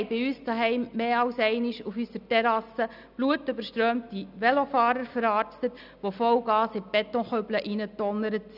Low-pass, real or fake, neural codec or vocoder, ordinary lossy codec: 5.4 kHz; real; none; none